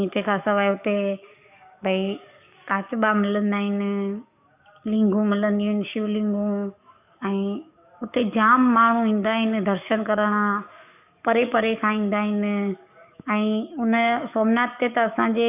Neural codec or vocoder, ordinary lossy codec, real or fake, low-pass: codec, 44.1 kHz, 7.8 kbps, DAC; none; fake; 3.6 kHz